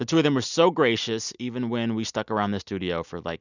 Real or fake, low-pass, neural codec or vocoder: real; 7.2 kHz; none